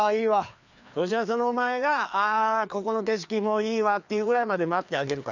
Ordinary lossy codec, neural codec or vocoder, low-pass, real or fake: none; codec, 16 kHz, 2 kbps, FreqCodec, larger model; 7.2 kHz; fake